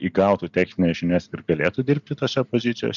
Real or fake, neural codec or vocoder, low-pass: real; none; 7.2 kHz